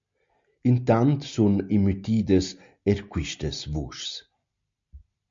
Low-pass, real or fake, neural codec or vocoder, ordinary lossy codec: 7.2 kHz; real; none; MP3, 48 kbps